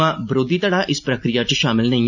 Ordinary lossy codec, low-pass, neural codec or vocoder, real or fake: none; 7.2 kHz; none; real